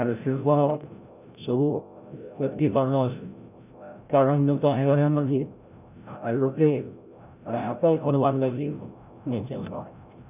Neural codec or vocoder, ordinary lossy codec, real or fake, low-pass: codec, 16 kHz, 0.5 kbps, FreqCodec, larger model; none; fake; 3.6 kHz